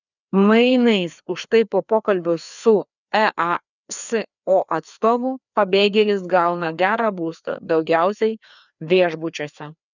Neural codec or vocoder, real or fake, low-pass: codec, 16 kHz, 2 kbps, FreqCodec, larger model; fake; 7.2 kHz